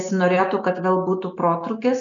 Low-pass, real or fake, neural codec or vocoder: 7.2 kHz; real; none